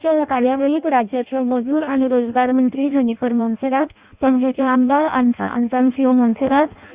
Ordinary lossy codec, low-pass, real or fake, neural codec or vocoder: Opus, 64 kbps; 3.6 kHz; fake; codec, 16 kHz in and 24 kHz out, 0.6 kbps, FireRedTTS-2 codec